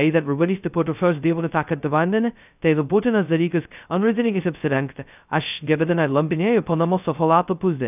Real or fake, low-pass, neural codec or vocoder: fake; 3.6 kHz; codec, 16 kHz, 0.2 kbps, FocalCodec